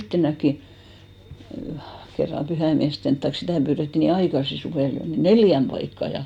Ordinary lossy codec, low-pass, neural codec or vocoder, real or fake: none; 19.8 kHz; none; real